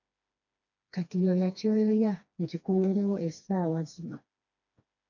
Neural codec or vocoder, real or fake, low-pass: codec, 16 kHz, 2 kbps, FreqCodec, smaller model; fake; 7.2 kHz